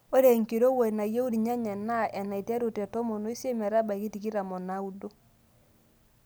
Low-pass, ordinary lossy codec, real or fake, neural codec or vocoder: none; none; real; none